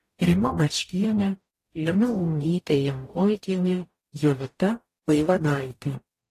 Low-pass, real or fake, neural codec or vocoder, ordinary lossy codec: 14.4 kHz; fake; codec, 44.1 kHz, 0.9 kbps, DAC; AAC, 48 kbps